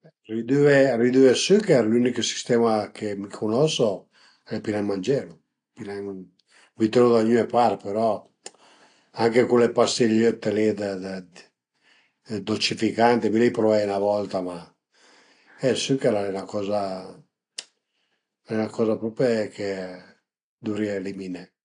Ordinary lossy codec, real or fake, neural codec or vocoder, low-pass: AAC, 48 kbps; real; none; 9.9 kHz